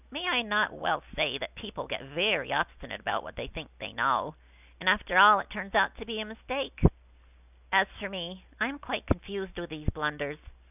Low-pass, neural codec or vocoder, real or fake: 3.6 kHz; none; real